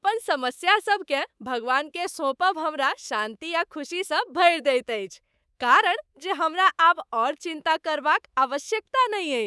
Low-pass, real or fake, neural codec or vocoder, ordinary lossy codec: none; fake; codec, 24 kHz, 3.1 kbps, DualCodec; none